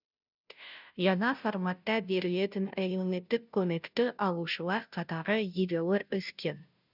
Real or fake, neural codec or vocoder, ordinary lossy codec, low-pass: fake; codec, 16 kHz, 0.5 kbps, FunCodec, trained on Chinese and English, 25 frames a second; none; 5.4 kHz